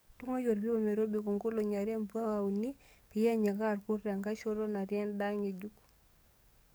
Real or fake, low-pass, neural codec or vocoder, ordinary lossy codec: fake; none; codec, 44.1 kHz, 7.8 kbps, DAC; none